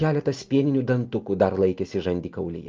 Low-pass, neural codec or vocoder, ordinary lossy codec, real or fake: 7.2 kHz; none; Opus, 24 kbps; real